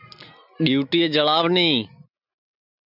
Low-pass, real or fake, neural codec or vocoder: 5.4 kHz; real; none